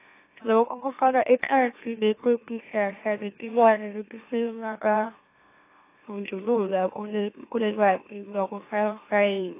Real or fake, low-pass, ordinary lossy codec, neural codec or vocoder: fake; 3.6 kHz; AAC, 24 kbps; autoencoder, 44.1 kHz, a latent of 192 numbers a frame, MeloTTS